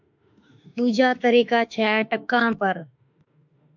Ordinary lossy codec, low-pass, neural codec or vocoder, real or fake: MP3, 64 kbps; 7.2 kHz; autoencoder, 48 kHz, 32 numbers a frame, DAC-VAE, trained on Japanese speech; fake